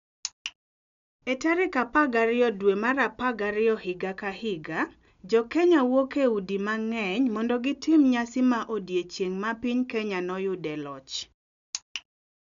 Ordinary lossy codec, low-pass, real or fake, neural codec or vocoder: none; 7.2 kHz; real; none